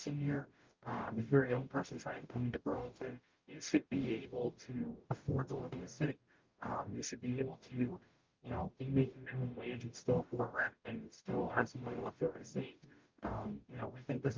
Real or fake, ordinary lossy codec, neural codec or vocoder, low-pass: fake; Opus, 24 kbps; codec, 44.1 kHz, 0.9 kbps, DAC; 7.2 kHz